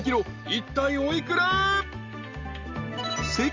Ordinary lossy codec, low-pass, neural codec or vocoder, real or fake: Opus, 32 kbps; 7.2 kHz; none; real